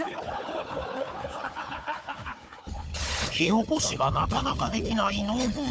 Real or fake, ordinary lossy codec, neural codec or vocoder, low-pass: fake; none; codec, 16 kHz, 4 kbps, FunCodec, trained on Chinese and English, 50 frames a second; none